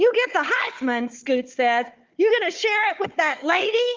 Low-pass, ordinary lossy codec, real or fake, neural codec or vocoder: 7.2 kHz; Opus, 32 kbps; fake; codec, 16 kHz, 4 kbps, FunCodec, trained on Chinese and English, 50 frames a second